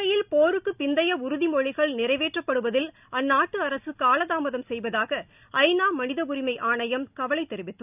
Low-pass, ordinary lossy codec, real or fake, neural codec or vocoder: 3.6 kHz; none; real; none